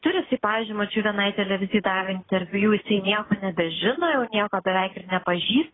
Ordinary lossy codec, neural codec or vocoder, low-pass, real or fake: AAC, 16 kbps; none; 7.2 kHz; real